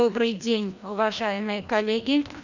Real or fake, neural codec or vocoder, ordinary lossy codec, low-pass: fake; codec, 16 kHz, 1 kbps, FreqCodec, larger model; none; 7.2 kHz